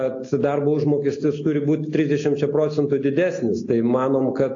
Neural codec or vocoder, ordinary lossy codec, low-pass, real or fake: none; AAC, 48 kbps; 7.2 kHz; real